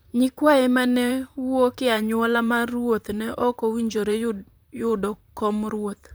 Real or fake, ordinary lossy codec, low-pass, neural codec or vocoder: fake; none; none; vocoder, 44.1 kHz, 128 mel bands, Pupu-Vocoder